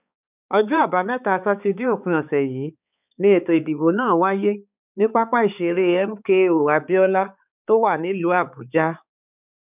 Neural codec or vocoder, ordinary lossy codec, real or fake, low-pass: codec, 16 kHz, 4 kbps, X-Codec, HuBERT features, trained on balanced general audio; none; fake; 3.6 kHz